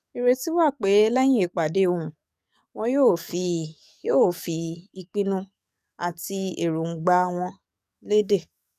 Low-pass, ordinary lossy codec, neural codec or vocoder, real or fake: 14.4 kHz; none; codec, 44.1 kHz, 7.8 kbps, DAC; fake